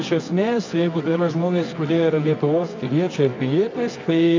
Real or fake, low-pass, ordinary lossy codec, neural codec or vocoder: fake; 7.2 kHz; MP3, 64 kbps; codec, 24 kHz, 0.9 kbps, WavTokenizer, medium music audio release